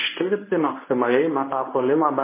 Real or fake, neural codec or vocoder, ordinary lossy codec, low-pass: fake; codec, 24 kHz, 0.9 kbps, WavTokenizer, medium speech release version 1; MP3, 16 kbps; 3.6 kHz